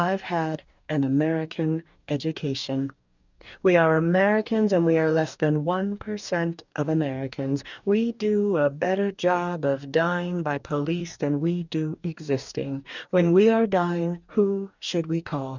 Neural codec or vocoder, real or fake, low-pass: codec, 44.1 kHz, 2.6 kbps, DAC; fake; 7.2 kHz